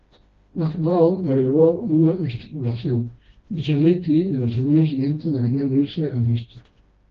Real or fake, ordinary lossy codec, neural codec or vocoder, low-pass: fake; Opus, 32 kbps; codec, 16 kHz, 1 kbps, FreqCodec, smaller model; 7.2 kHz